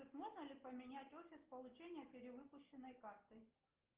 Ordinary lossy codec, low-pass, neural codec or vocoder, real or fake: Opus, 16 kbps; 3.6 kHz; none; real